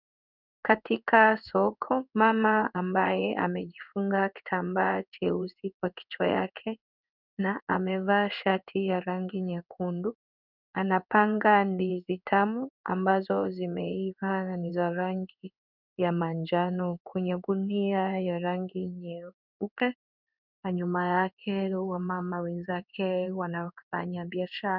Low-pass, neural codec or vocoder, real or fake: 5.4 kHz; codec, 16 kHz in and 24 kHz out, 1 kbps, XY-Tokenizer; fake